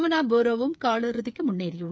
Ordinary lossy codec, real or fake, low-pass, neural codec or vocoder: none; fake; none; codec, 16 kHz, 8 kbps, FreqCodec, smaller model